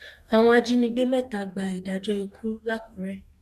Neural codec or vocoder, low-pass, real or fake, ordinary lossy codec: codec, 44.1 kHz, 2.6 kbps, DAC; 14.4 kHz; fake; MP3, 96 kbps